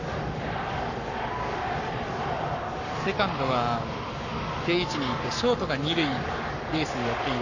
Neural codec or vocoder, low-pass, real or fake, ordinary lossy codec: codec, 44.1 kHz, 7.8 kbps, Pupu-Codec; 7.2 kHz; fake; none